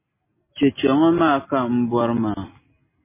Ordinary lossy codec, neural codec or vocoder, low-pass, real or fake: MP3, 16 kbps; none; 3.6 kHz; real